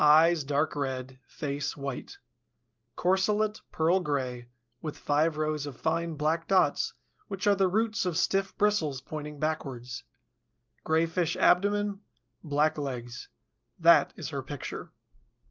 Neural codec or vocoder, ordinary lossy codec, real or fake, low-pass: none; Opus, 24 kbps; real; 7.2 kHz